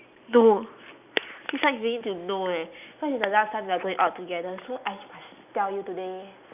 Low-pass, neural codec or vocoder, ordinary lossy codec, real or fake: 3.6 kHz; none; none; real